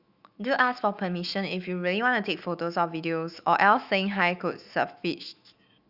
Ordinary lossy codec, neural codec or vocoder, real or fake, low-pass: Opus, 64 kbps; autoencoder, 48 kHz, 128 numbers a frame, DAC-VAE, trained on Japanese speech; fake; 5.4 kHz